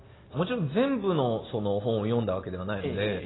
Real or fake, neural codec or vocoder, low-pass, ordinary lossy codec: real; none; 7.2 kHz; AAC, 16 kbps